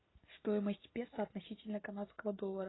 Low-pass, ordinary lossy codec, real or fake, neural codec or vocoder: 7.2 kHz; AAC, 16 kbps; real; none